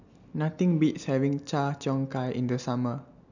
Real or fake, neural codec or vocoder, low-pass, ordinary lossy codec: real; none; 7.2 kHz; none